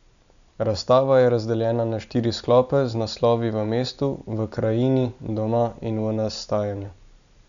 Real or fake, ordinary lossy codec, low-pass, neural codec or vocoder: real; none; 7.2 kHz; none